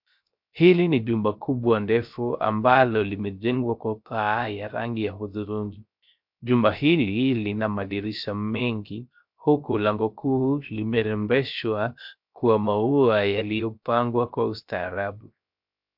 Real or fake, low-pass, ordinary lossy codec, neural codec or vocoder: fake; 5.4 kHz; MP3, 48 kbps; codec, 16 kHz, 0.3 kbps, FocalCodec